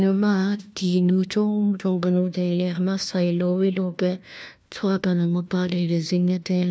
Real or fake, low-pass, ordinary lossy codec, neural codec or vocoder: fake; none; none; codec, 16 kHz, 1 kbps, FunCodec, trained on LibriTTS, 50 frames a second